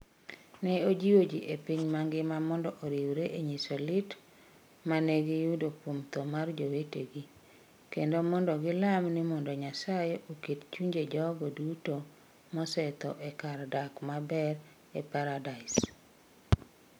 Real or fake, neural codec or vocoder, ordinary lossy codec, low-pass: real; none; none; none